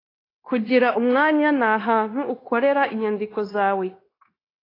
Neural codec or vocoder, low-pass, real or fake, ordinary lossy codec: codec, 16 kHz, 0.9 kbps, LongCat-Audio-Codec; 5.4 kHz; fake; AAC, 24 kbps